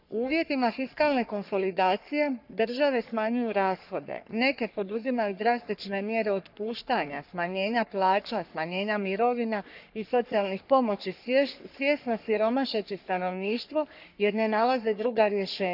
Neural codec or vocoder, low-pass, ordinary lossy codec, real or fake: codec, 44.1 kHz, 3.4 kbps, Pupu-Codec; 5.4 kHz; none; fake